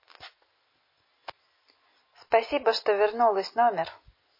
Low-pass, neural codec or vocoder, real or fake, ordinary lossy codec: 5.4 kHz; none; real; MP3, 24 kbps